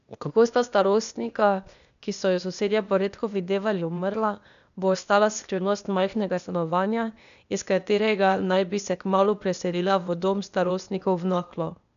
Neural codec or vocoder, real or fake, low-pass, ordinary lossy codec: codec, 16 kHz, 0.8 kbps, ZipCodec; fake; 7.2 kHz; none